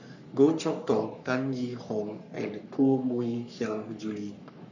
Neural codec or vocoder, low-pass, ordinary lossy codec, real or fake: codec, 44.1 kHz, 3.4 kbps, Pupu-Codec; 7.2 kHz; none; fake